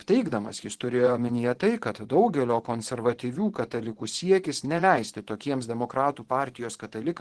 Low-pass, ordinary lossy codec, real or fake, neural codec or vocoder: 10.8 kHz; Opus, 16 kbps; fake; vocoder, 24 kHz, 100 mel bands, Vocos